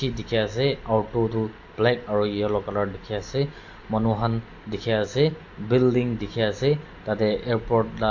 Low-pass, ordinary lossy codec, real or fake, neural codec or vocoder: 7.2 kHz; AAC, 48 kbps; real; none